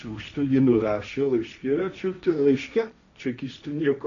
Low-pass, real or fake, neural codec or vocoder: 7.2 kHz; fake; codec, 16 kHz, 1.1 kbps, Voila-Tokenizer